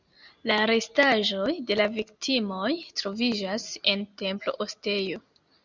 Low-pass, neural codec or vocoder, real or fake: 7.2 kHz; none; real